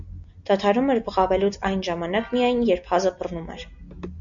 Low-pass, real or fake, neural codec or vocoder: 7.2 kHz; real; none